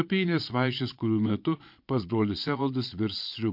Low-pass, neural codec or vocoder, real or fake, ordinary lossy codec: 5.4 kHz; vocoder, 22.05 kHz, 80 mel bands, Vocos; fake; MP3, 48 kbps